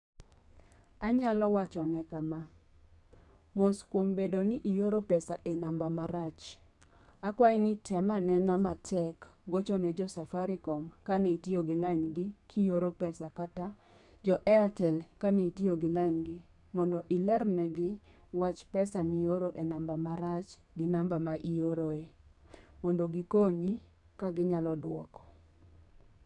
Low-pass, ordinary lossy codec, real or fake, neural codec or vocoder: 10.8 kHz; none; fake; codec, 32 kHz, 1.9 kbps, SNAC